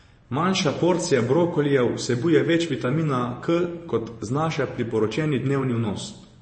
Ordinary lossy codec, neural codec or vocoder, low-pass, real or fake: MP3, 32 kbps; vocoder, 24 kHz, 100 mel bands, Vocos; 9.9 kHz; fake